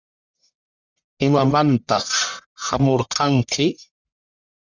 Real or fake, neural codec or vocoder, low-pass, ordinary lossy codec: fake; codec, 44.1 kHz, 1.7 kbps, Pupu-Codec; 7.2 kHz; Opus, 64 kbps